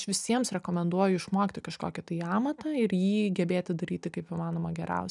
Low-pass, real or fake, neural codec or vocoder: 10.8 kHz; real; none